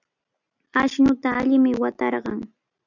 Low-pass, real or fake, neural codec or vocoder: 7.2 kHz; real; none